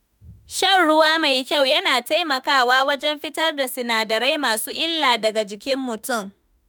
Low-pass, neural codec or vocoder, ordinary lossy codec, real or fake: none; autoencoder, 48 kHz, 32 numbers a frame, DAC-VAE, trained on Japanese speech; none; fake